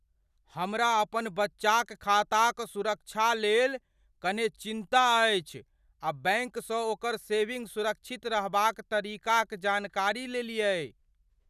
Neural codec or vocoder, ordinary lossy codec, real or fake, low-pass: none; none; real; 14.4 kHz